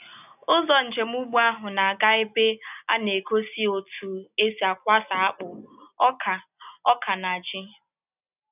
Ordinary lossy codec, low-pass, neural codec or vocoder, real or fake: none; 3.6 kHz; none; real